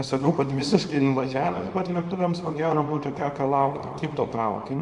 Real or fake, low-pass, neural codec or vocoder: fake; 10.8 kHz; codec, 24 kHz, 0.9 kbps, WavTokenizer, small release